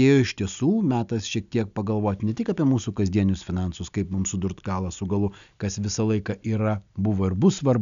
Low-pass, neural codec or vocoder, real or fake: 7.2 kHz; none; real